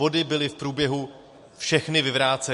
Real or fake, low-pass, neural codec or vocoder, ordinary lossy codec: real; 14.4 kHz; none; MP3, 48 kbps